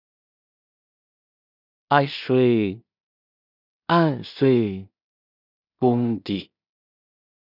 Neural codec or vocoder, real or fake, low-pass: codec, 16 kHz in and 24 kHz out, 0.4 kbps, LongCat-Audio-Codec, two codebook decoder; fake; 5.4 kHz